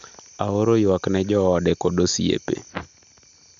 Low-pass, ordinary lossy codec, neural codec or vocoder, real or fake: 7.2 kHz; none; none; real